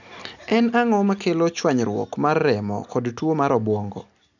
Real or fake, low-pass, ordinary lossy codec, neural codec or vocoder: real; 7.2 kHz; none; none